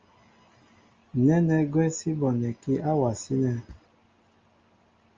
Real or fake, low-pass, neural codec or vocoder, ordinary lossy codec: real; 7.2 kHz; none; Opus, 32 kbps